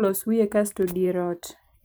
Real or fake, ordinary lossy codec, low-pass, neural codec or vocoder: fake; none; none; vocoder, 44.1 kHz, 128 mel bands every 512 samples, BigVGAN v2